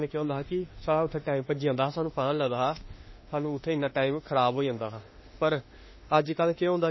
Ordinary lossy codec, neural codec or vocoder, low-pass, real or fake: MP3, 24 kbps; autoencoder, 48 kHz, 32 numbers a frame, DAC-VAE, trained on Japanese speech; 7.2 kHz; fake